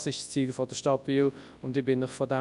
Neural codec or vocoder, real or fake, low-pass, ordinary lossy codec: codec, 24 kHz, 0.9 kbps, WavTokenizer, large speech release; fake; 10.8 kHz; none